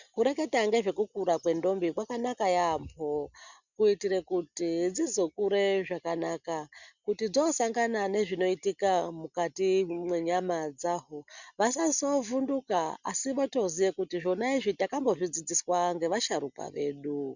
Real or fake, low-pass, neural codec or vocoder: real; 7.2 kHz; none